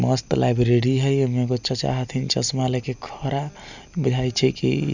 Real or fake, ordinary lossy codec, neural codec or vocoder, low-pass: real; none; none; 7.2 kHz